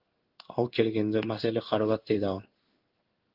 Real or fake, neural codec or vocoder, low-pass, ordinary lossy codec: fake; codec, 16 kHz in and 24 kHz out, 1 kbps, XY-Tokenizer; 5.4 kHz; Opus, 32 kbps